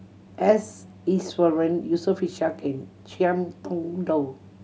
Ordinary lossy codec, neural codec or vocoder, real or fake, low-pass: none; none; real; none